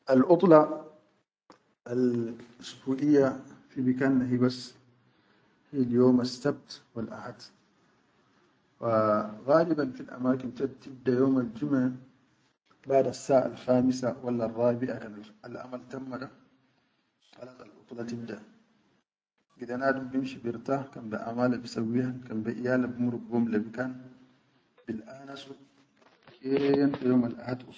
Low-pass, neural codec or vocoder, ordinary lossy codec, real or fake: none; none; none; real